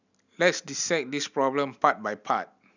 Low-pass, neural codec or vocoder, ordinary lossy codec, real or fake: 7.2 kHz; none; none; real